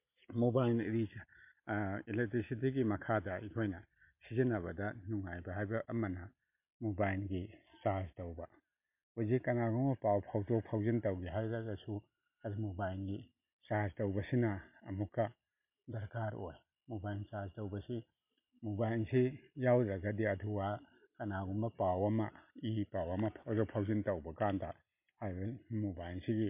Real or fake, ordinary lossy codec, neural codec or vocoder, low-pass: real; MP3, 32 kbps; none; 3.6 kHz